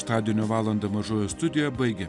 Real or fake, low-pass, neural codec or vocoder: real; 10.8 kHz; none